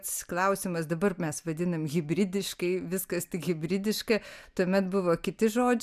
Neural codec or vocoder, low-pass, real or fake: none; 14.4 kHz; real